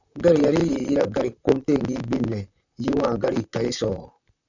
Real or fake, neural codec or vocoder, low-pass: fake; vocoder, 44.1 kHz, 128 mel bands, Pupu-Vocoder; 7.2 kHz